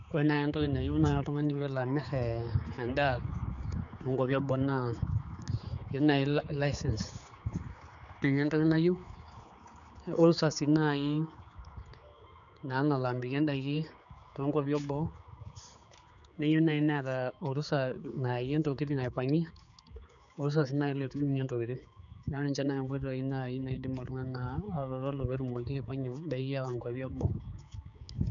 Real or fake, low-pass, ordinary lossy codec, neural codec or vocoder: fake; 7.2 kHz; none; codec, 16 kHz, 4 kbps, X-Codec, HuBERT features, trained on general audio